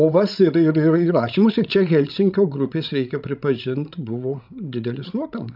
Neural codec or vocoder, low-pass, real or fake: codec, 16 kHz, 16 kbps, FreqCodec, larger model; 5.4 kHz; fake